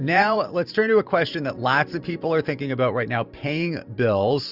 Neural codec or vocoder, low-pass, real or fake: vocoder, 44.1 kHz, 128 mel bands every 512 samples, BigVGAN v2; 5.4 kHz; fake